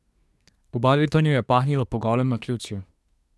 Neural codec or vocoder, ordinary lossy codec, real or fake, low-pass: codec, 24 kHz, 1 kbps, SNAC; none; fake; none